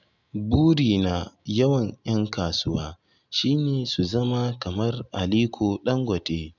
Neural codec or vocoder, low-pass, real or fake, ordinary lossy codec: none; 7.2 kHz; real; none